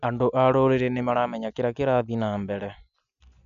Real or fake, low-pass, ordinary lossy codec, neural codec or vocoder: real; 7.2 kHz; none; none